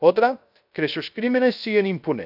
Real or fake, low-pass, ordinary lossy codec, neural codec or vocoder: fake; 5.4 kHz; none; codec, 16 kHz, 0.3 kbps, FocalCodec